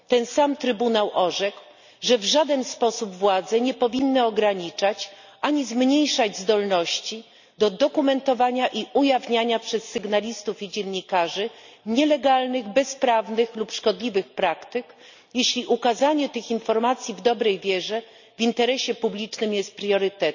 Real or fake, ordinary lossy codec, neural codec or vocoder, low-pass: real; none; none; 7.2 kHz